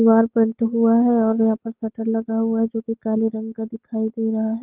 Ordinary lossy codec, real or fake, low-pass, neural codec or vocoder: Opus, 16 kbps; real; 3.6 kHz; none